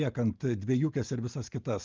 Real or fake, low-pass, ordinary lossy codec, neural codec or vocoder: real; 7.2 kHz; Opus, 24 kbps; none